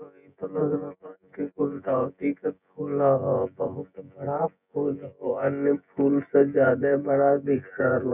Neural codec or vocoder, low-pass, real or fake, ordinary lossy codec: vocoder, 24 kHz, 100 mel bands, Vocos; 3.6 kHz; fake; none